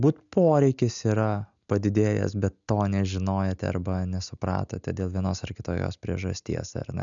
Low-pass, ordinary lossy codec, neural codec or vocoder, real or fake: 7.2 kHz; MP3, 96 kbps; none; real